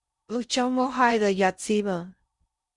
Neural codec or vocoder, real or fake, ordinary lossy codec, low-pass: codec, 16 kHz in and 24 kHz out, 0.6 kbps, FocalCodec, streaming, 4096 codes; fake; Opus, 64 kbps; 10.8 kHz